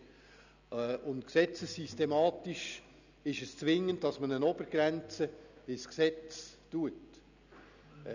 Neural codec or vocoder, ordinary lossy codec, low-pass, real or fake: none; none; 7.2 kHz; real